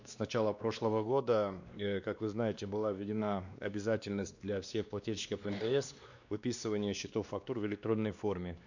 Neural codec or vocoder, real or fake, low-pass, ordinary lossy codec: codec, 16 kHz, 2 kbps, X-Codec, WavLM features, trained on Multilingual LibriSpeech; fake; 7.2 kHz; none